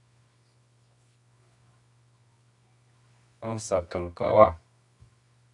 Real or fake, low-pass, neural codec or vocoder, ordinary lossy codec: fake; 10.8 kHz; codec, 24 kHz, 0.9 kbps, WavTokenizer, medium music audio release; AAC, 64 kbps